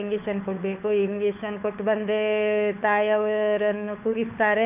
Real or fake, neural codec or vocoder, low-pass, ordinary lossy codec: fake; codec, 16 kHz, 4 kbps, FunCodec, trained on LibriTTS, 50 frames a second; 3.6 kHz; none